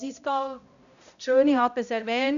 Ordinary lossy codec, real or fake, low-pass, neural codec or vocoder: none; fake; 7.2 kHz; codec, 16 kHz, 0.5 kbps, X-Codec, HuBERT features, trained on balanced general audio